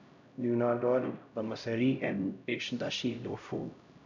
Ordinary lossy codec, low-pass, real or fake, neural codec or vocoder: none; 7.2 kHz; fake; codec, 16 kHz, 0.5 kbps, X-Codec, HuBERT features, trained on LibriSpeech